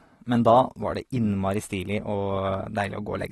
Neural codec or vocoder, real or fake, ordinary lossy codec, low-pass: none; real; AAC, 32 kbps; 19.8 kHz